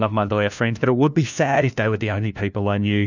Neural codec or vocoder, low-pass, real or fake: codec, 16 kHz, 1 kbps, FunCodec, trained on LibriTTS, 50 frames a second; 7.2 kHz; fake